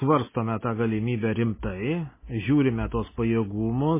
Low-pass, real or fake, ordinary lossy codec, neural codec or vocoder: 3.6 kHz; real; MP3, 16 kbps; none